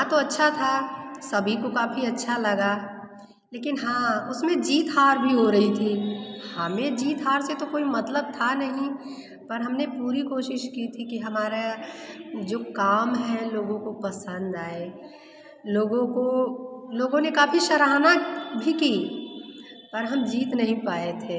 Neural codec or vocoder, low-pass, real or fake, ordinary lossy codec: none; none; real; none